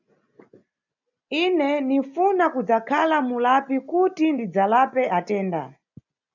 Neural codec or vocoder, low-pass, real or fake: none; 7.2 kHz; real